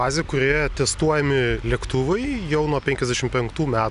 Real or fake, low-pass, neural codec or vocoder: real; 10.8 kHz; none